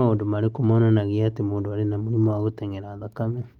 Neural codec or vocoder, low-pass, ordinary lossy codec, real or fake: none; 19.8 kHz; Opus, 32 kbps; real